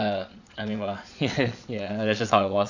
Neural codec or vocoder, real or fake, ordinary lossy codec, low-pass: vocoder, 22.05 kHz, 80 mel bands, Vocos; fake; none; 7.2 kHz